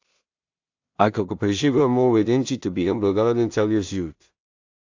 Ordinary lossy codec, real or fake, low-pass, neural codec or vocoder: AAC, 48 kbps; fake; 7.2 kHz; codec, 16 kHz in and 24 kHz out, 0.4 kbps, LongCat-Audio-Codec, two codebook decoder